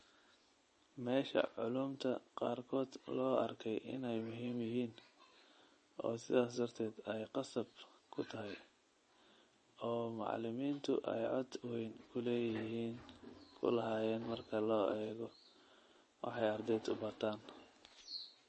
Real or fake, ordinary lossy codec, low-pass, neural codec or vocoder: fake; MP3, 32 kbps; 10.8 kHz; vocoder, 24 kHz, 100 mel bands, Vocos